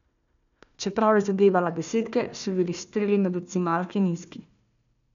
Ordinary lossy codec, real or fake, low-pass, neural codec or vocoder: none; fake; 7.2 kHz; codec, 16 kHz, 1 kbps, FunCodec, trained on Chinese and English, 50 frames a second